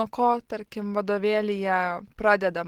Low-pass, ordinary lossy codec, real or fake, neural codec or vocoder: 19.8 kHz; Opus, 16 kbps; real; none